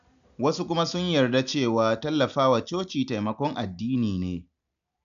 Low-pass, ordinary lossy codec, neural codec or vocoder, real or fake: 7.2 kHz; none; none; real